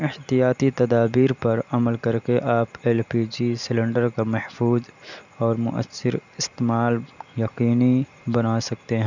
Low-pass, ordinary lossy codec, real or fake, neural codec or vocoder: 7.2 kHz; none; real; none